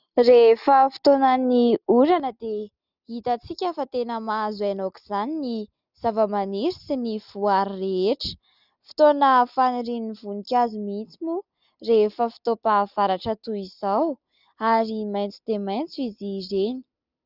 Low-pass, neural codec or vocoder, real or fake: 5.4 kHz; none; real